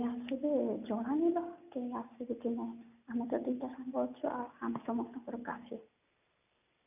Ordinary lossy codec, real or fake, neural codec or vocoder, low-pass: none; real; none; 3.6 kHz